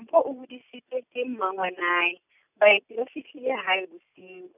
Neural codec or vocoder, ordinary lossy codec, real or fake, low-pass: none; none; real; 3.6 kHz